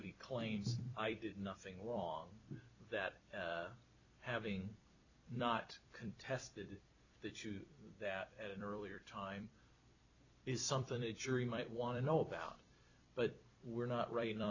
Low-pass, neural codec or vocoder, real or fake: 7.2 kHz; vocoder, 44.1 kHz, 128 mel bands every 256 samples, BigVGAN v2; fake